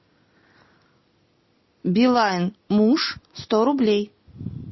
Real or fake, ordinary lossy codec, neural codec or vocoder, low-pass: real; MP3, 24 kbps; none; 7.2 kHz